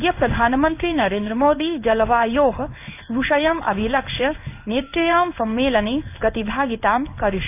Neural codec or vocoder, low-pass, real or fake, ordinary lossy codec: codec, 16 kHz in and 24 kHz out, 1 kbps, XY-Tokenizer; 3.6 kHz; fake; none